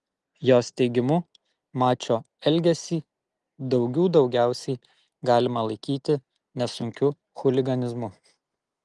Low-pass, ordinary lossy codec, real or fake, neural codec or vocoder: 10.8 kHz; Opus, 24 kbps; real; none